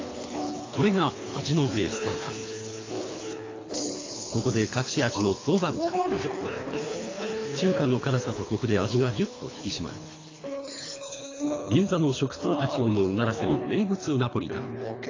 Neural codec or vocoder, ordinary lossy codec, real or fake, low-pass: codec, 24 kHz, 3 kbps, HILCodec; AAC, 32 kbps; fake; 7.2 kHz